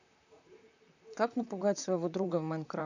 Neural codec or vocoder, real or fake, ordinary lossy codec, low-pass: vocoder, 44.1 kHz, 128 mel bands, Pupu-Vocoder; fake; none; 7.2 kHz